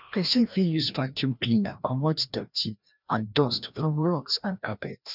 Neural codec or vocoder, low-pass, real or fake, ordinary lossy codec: codec, 16 kHz, 1 kbps, FreqCodec, larger model; 5.4 kHz; fake; none